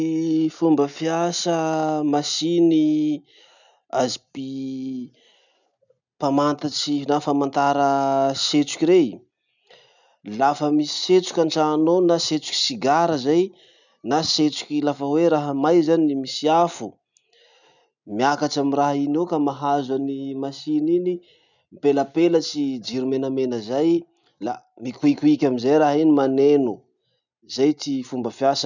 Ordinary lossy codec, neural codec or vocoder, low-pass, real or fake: none; none; 7.2 kHz; real